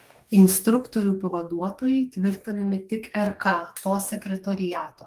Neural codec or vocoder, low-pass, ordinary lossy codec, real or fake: codec, 44.1 kHz, 2.6 kbps, DAC; 14.4 kHz; Opus, 24 kbps; fake